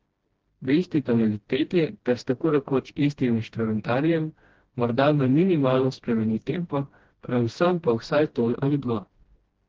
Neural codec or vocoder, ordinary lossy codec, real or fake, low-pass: codec, 16 kHz, 1 kbps, FreqCodec, smaller model; Opus, 16 kbps; fake; 7.2 kHz